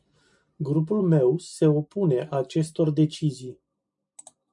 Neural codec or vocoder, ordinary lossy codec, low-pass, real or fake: none; MP3, 96 kbps; 10.8 kHz; real